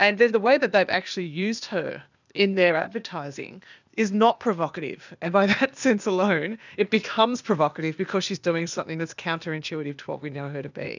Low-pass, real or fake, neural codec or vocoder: 7.2 kHz; fake; codec, 16 kHz, 0.8 kbps, ZipCodec